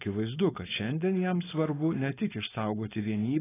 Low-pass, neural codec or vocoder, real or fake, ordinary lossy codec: 3.6 kHz; codec, 16 kHz, 4.8 kbps, FACodec; fake; AAC, 16 kbps